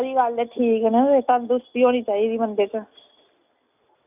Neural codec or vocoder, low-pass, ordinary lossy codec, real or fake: none; 3.6 kHz; none; real